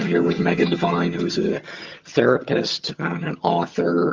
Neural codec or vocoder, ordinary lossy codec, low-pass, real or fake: vocoder, 22.05 kHz, 80 mel bands, HiFi-GAN; Opus, 32 kbps; 7.2 kHz; fake